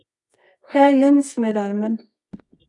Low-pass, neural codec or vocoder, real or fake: 10.8 kHz; codec, 24 kHz, 0.9 kbps, WavTokenizer, medium music audio release; fake